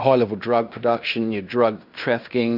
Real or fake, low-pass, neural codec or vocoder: fake; 5.4 kHz; codec, 16 kHz in and 24 kHz out, 0.9 kbps, LongCat-Audio-Codec, fine tuned four codebook decoder